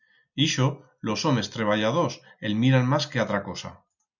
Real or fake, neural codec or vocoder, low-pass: real; none; 7.2 kHz